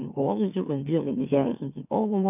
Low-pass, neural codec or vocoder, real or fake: 3.6 kHz; autoencoder, 44.1 kHz, a latent of 192 numbers a frame, MeloTTS; fake